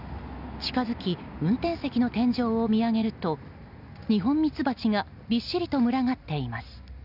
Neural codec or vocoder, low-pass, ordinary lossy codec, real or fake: none; 5.4 kHz; none; real